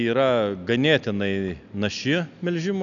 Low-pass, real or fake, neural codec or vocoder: 7.2 kHz; real; none